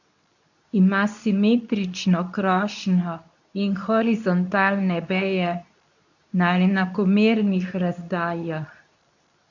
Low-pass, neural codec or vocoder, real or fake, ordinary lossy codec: 7.2 kHz; codec, 24 kHz, 0.9 kbps, WavTokenizer, medium speech release version 2; fake; none